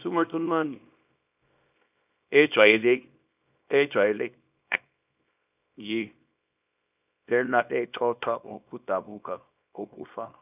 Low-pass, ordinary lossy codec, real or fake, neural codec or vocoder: 3.6 kHz; none; fake; codec, 24 kHz, 0.9 kbps, WavTokenizer, small release